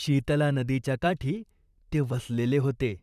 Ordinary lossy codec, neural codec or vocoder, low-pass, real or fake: none; vocoder, 44.1 kHz, 128 mel bands, Pupu-Vocoder; 14.4 kHz; fake